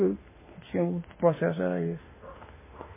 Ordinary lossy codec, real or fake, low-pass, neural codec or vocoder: MP3, 16 kbps; fake; 3.6 kHz; autoencoder, 48 kHz, 32 numbers a frame, DAC-VAE, trained on Japanese speech